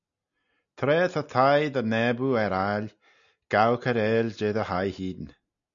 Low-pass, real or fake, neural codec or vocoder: 7.2 kHz; real; none